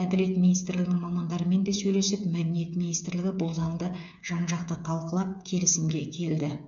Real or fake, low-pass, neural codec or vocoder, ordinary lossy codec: fake; 7.2 kHz; codec, 16 kHz, 8 kbps, FreqCodec, smaller model; none